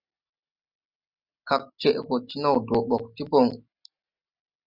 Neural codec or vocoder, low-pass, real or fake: none; 5.4 kHz; real